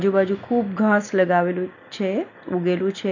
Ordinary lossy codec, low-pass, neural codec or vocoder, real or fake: none; 7.2 kHz; none; real